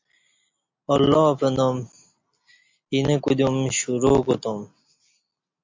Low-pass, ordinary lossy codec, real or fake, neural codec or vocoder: 7.2 kHz; MP3, 48 kbps; real; none